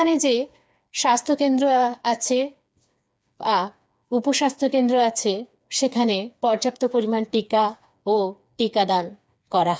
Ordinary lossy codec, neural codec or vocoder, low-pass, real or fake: none; codec, 16 kHz, 4 kbps, FreqCodec, smaller model; none; fake